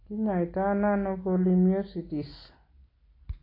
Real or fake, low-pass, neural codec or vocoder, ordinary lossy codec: real; 5.4 kHz; none; AAC, 24 kbps